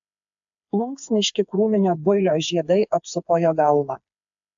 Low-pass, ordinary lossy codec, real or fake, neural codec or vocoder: 7.2 kHz; AAC, 64 kbps; fake; codec, 16 kHz, 4 kbps, FreqCodec, smaller model